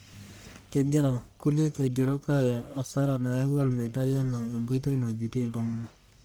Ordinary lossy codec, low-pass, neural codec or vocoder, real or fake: none; none; codec, 44.1 kHz, 1.7 kbps, Pupu-Codec; fake